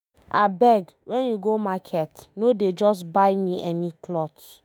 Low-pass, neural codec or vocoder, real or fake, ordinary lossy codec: none; autoencoder, 48 kHz, 32 numbers a frame, DAC-VAE, trained on Japanese speech; fake; none